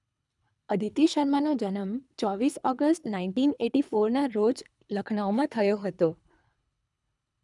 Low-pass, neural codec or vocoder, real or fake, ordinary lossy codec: 10.8 kHz; codec, 24 kHz, 3 kbps, HILCodec; fake; none